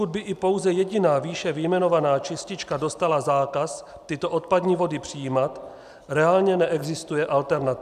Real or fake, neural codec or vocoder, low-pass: real; none; 14.4 kHz